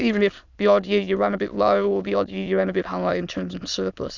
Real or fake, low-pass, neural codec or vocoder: fake; 7.2 kHz; autoencoder, 22.05 kHz, a latent of 192 numbers a frame, VITS, trained on many speakers